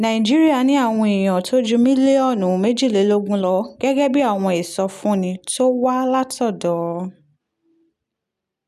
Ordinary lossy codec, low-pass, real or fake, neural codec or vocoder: none; 14.4 kHz; real; none